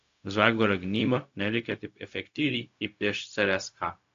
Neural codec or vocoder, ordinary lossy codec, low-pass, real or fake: codec, 16 kHz, 0.4 kbps, LongCat-Audio-Codec; AAC, 48 kbps; 7.2 kHz; fake